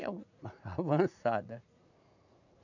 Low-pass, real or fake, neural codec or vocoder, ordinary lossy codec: 7.2 kHz; fake; vocoder, 44.1 kHz, 80 mel bands, Vocos; none